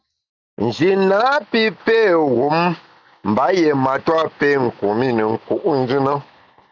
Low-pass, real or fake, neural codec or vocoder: 7.2 kHz; real; none